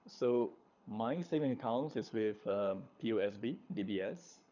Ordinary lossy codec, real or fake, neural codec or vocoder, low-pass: none; fake; codec, 24 kHz, 6 kbps, HILCodec; 7.2 kHz